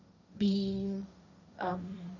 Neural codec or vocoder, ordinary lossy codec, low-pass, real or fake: codec, 16 kHz, 1.1 kbps, Voila-Tokenizer; none; 7.2 kHz; fake